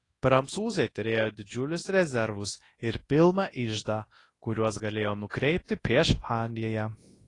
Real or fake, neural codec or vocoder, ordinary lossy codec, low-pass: fake; codec, 24 kHz, 0.9 kbps, WavTokenizer, large speech release; AAC, 32 kbps; 10.8 kHz